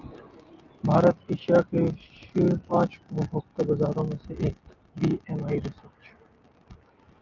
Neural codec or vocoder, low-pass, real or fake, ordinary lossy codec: none; 7.2 kHz; real; Opus, 24 kbps